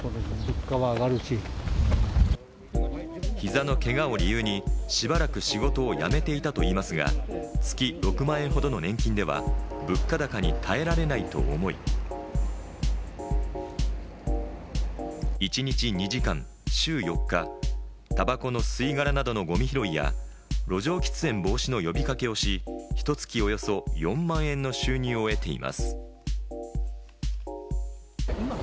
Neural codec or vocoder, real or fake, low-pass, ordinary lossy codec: none; real; none; none